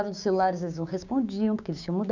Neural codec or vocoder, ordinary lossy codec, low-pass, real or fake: codec, 16 kHz, 6 kbps, DAC; none; 7.2 kHz; fake